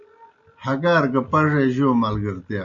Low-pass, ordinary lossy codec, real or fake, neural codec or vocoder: 7.2 kHz; Opus, 64 kbps; real; none